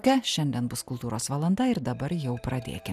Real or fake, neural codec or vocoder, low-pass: real; none; 14.4 kHz